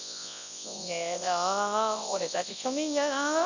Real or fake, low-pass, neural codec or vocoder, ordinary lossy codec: fake; 7.2 kHz; codec, 24 kHz, 0.9 kbps, WavTokenizer, large speech release; none